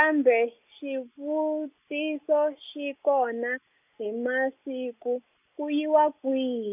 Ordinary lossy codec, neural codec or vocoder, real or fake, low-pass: none; none; real; 3.6 kHz